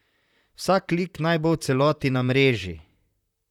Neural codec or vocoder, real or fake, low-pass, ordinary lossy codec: vocoder, 44.1 kHz, 128 mel bands, Pupu-Vocoder; fake; 19.8 kHz; none